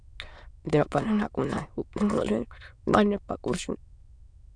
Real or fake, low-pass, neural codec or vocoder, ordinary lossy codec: fake; 9.9 kHz; autoencoder, 22.05 kHz, a latent of 192 numbers a frame, VITS, trained on many speakers; MP3, 96 kbps